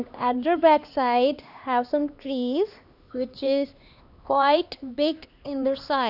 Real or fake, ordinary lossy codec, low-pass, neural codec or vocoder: fake; none; 5.4 kHz; codec, 16 kHz, 2 kbps, X-Codec, HuBERT features, trained on LibriSpeech